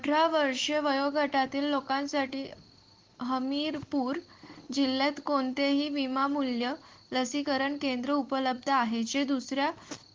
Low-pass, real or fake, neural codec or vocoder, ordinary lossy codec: 7.2 kHz; real; none; Opus, 16 kbps